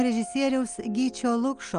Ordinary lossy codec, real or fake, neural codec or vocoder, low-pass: Opus, 32 kbps; real; none; 9.9 kHz